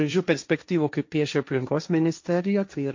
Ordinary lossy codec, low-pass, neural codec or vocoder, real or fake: MP3, 48 kbps; 7.2 kHz; codec, 16 kHz, 1.1 kbps, Voila-Tokenizer; fake